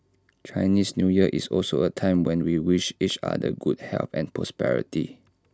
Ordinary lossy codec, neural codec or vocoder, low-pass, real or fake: none; none; none; real